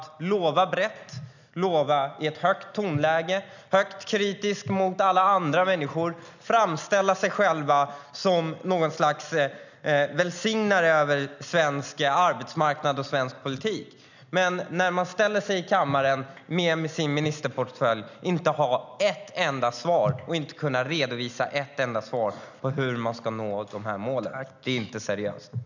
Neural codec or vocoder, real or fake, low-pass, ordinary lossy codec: none; real; 7.2 kHz; none